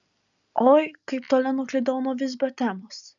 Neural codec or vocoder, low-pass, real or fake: none; 7.2 kHz; real